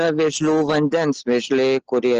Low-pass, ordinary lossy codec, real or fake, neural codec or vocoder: 7.2 kHz; Opus, 16 kbps; real; none